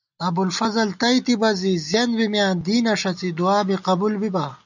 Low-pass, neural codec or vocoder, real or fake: 7.2 kHz; none; real